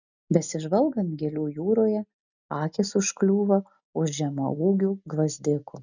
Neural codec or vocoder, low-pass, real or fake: none; 7.2 kHz; real